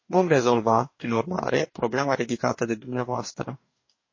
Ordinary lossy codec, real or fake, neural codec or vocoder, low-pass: MP3, 32 kbps; fake; codec, 44.1 kHz, 2.6 kbps, DAC; 7.2 kHz